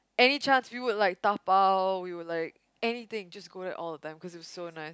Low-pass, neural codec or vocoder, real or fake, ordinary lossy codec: none; none; real; none